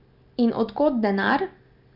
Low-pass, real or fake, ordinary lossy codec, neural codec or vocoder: 5.4 kHz; real; none; none